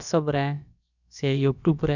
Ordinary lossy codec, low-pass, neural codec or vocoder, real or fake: none; 7.2 kHz; codec, 16 kHz, about 1 kbps, DyCAST, with the encoder's durations; fake